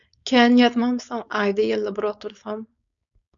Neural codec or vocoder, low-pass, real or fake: codec, 16 kHz, 4.8 kbps, FACodec; 7.2 kHz; fake